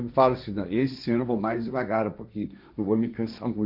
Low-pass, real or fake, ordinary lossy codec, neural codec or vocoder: 5.4 kHz; fake; none; codec, 16 kHz, 1.1 kbps, Voila-Tokenizer